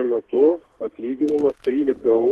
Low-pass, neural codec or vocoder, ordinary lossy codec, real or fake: 14.4 kHz; codec, 32 kHz, 1.9 kbps, SNAC; Opus, 16 kbps; fake